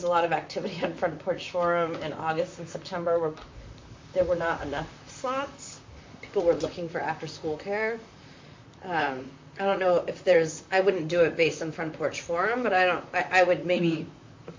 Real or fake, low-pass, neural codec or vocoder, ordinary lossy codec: fake; 7.2 kHz; vocoder, 44.1 kHz, 128 mel bands, Pupu-Vocoder; MP3, 48 kbps